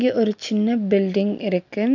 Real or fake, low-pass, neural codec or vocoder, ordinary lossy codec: real; 7.2 kHz; none; none